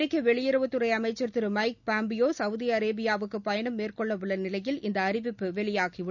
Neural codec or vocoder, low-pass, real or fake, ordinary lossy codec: none; 7.2 kHz; real; none